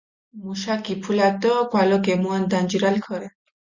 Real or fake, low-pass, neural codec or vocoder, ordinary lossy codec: real; 7.2 kHz; none; Opus, 64 kbps